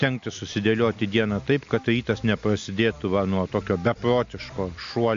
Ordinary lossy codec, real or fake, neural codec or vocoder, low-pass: AAC, 64 kbps; real; none; 7.2 kHz